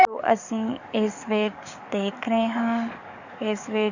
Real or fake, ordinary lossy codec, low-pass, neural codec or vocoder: fake; none; 7.2 kHz; codec, 44.1 kHz, 7.8 kbps, DAC